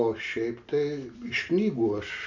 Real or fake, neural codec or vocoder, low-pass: real; none; 7.2 kHz